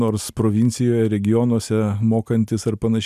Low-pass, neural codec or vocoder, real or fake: 14.4 kHz; none; real